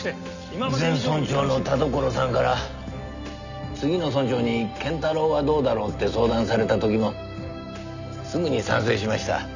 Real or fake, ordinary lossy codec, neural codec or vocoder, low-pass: real; none; none; 7.2 kHz